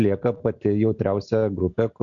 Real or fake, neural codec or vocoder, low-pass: real; none; 7.2 kHz